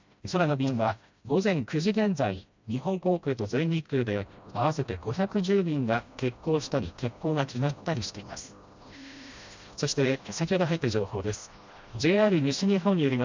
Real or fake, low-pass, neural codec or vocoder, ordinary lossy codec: fake; 7.2 kHz; codec, 16 kHz, 1 kbps, FreqCodec, smaller model; MP3, 64 kbps